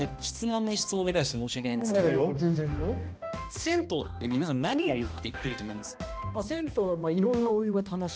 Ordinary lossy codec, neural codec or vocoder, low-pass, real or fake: none; codec, 16 kHz, 1 kbps, X-Codec, HuBERT features, trained on balanced general audio; none; fake